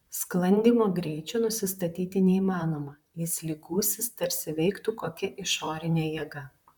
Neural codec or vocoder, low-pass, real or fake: vocoder, 44.1 kHz, 128 mel bands, Pupu-Vocoder; 19.8 kHz; fake